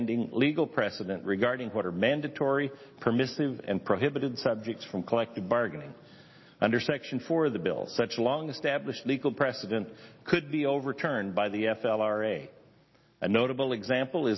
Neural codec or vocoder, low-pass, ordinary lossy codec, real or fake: none; 7.2 kHz; MP3, 24 kbps; real